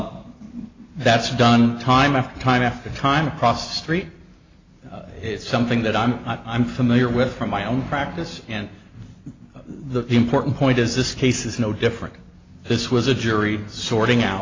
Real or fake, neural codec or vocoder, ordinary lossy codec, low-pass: real; none; AAC, 32 kbps; 7.2 kHz